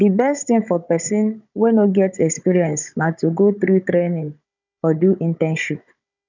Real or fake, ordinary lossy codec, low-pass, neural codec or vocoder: fake; none; 7.2 kHz; codec, 16 kHz, 16 kbps, FunCodec, trained on Chinese and English, 50 frames a second